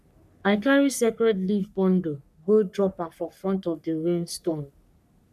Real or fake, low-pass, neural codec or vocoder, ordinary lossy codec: fake; 14.4 kHz; codec, 44.1 kHz, 3.4 kbps, Pupu-Codec; none